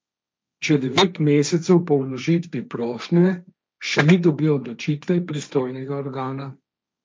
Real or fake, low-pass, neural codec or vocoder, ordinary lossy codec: fake; none; codec, 16 kHz, 1.1 kbps, Voila-Tokenizer; none